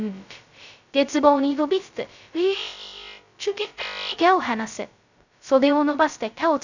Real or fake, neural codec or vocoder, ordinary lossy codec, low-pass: fake; codec, 16 kHz, 0.2 kbps, FocalCodec; none; 7.2 kHz